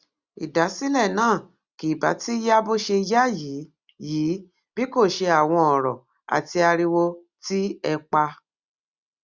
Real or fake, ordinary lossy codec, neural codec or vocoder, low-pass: real; Opus, 64 kbps; none; 7.2 kHz